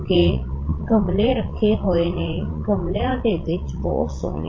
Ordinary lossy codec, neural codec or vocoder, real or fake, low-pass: MP3, 32 kbps; vocoder, 22.05 kHz, 80 mel bands, Vocos; fake; 7.2 kHz